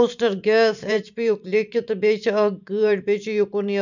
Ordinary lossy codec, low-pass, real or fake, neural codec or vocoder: none; 7.2 kHz; fake; vocoder, 44.1 kHz, 128 mel bands every 512 samples, BigVGAN v2